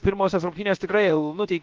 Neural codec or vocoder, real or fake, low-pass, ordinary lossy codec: codec, 16 kHz, about 1 kbps, DyCAST, with the encoder's durations; fake; 7.2 kHz; Opus, 32 kbps